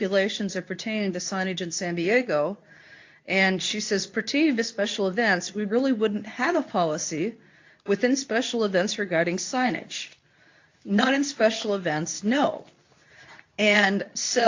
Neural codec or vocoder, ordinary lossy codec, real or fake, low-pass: codec, 24 kHz, 0.9 kbps, WavTokenizer, medium speech release version 2; AAC, 48 kbps; fake; 7.2 kHz